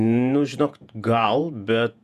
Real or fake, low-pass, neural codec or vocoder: fake; 14.4 kHz; vocoder, 48 kHz, 128 mel bands, Vocos